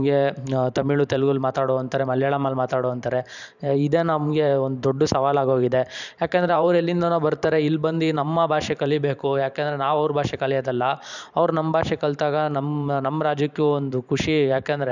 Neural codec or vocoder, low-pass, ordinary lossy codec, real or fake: vocoder, 44.1 kHz, 128 mel bands every 512 samples, BigVGAN v2; 7.2 kHz; none; fake